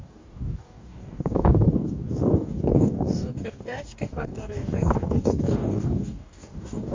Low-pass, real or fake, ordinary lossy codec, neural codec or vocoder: 7.2 kHz; fake; MP3, 48 kbps; codec, 44.1 kHz, 2.6 kbps, DAC